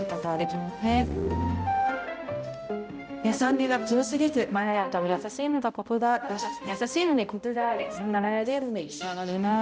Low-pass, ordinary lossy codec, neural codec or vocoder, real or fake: none; none; codec, 16 kHz, 0.5 kbps, X-Codec, HuBERT features, trained on balanced general audio; fake